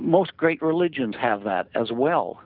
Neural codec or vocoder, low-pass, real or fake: none; 5.4 kHz; real